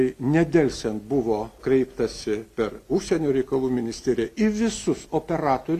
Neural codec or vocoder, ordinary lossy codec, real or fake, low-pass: none; MP3, 96 kbps; real; 14.4 kHz